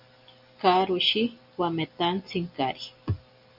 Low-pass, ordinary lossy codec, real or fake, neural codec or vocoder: 5.4 kHz; MP3, 48 kbps; real; none